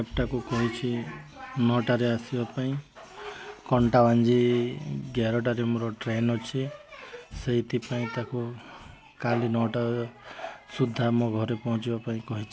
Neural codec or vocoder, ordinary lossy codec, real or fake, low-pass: none; none; real; none